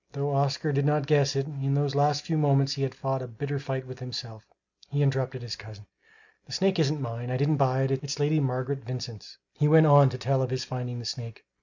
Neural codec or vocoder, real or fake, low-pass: none; real; 7.2 kHz